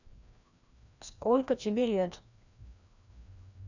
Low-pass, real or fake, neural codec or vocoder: 7.2 kHz; fake; codec, 16 kHz, 1 kbps, FreqCodec, larger model